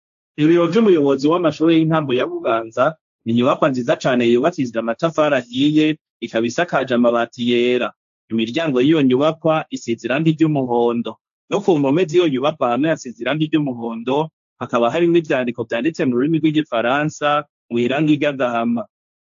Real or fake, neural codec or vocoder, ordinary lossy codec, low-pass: fake; codec, 16 kHz, 1.1 kbps, Voila-Tokenizer; AAC, 64 kbps; 7.2 kHz